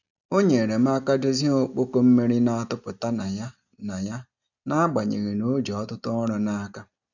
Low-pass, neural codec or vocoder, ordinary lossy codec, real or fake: 7.2 kHz; none; none; real